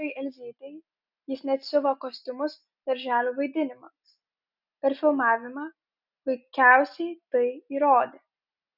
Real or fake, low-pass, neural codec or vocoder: real; 5.4 kHz; none